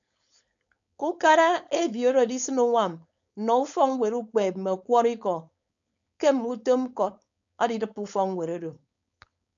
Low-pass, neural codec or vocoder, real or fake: 7.2 kHz; codec, 16 kHz, 4.8 kbps, FACodec; fake